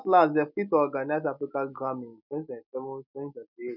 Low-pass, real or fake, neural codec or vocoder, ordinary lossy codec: 5.4 kHz; real; none; none